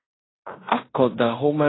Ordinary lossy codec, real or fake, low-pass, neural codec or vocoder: AAC, 16 kbps; fake; 7.2 kHz; codec, 16 kHz in and 24 kHz out, 0.9 kbps, LongCat-Audio-Codec, fine tuned four codebook decoder